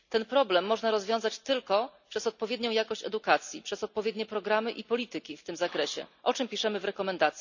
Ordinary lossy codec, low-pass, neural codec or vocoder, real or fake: none; 7.2 kHz; none; real